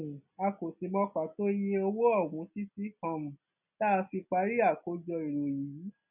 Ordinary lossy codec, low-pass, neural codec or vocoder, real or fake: none; 3.6 kHz; none; real